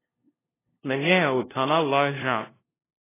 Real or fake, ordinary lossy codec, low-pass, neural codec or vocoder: fake; AAC, 16 kbps; 3.6 kHz; codec, 16 kHz, 0.5 kbps, FunCodec, trained on LibriTTS, 25 frames a second